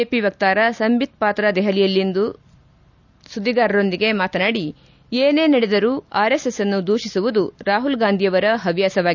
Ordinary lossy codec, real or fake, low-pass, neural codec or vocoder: none; real; 7.2 kHz; none